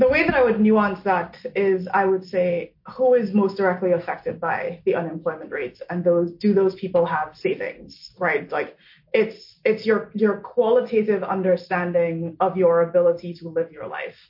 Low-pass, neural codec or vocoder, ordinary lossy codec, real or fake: 5.4 kHz; none; MP3, 32 kbps; real